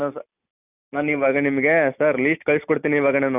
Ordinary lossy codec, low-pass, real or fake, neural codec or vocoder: none; 3.6 kHz; real; none